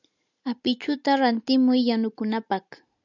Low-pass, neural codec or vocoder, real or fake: 7.2 kHz; none; real